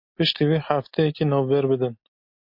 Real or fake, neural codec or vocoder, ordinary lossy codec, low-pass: real; none; MP3, 32 kbps; 5.4 kHz